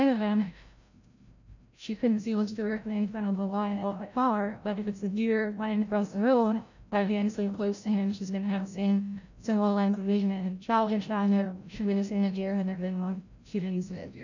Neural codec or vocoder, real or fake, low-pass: codec, 16 kHz, 0.5 kbps, FreqCodec, larger model; fake; 7.2 kHz